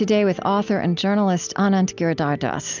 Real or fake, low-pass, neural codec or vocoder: real; 7.2 kHz; none